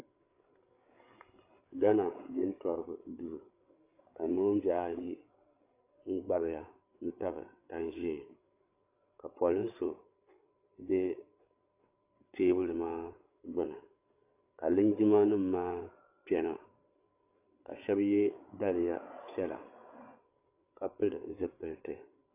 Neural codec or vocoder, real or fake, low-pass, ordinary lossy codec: codec, 16 kHz, 8 kbps, FreqCodec, larger model; fake; 3.6 kHz; AAC, 24 kbps